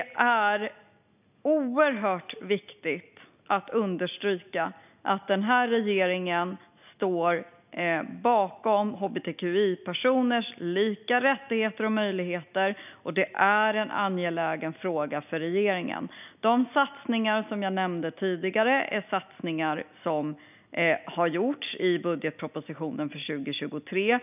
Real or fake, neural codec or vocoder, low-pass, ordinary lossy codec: real; none; 3.6 kHz; none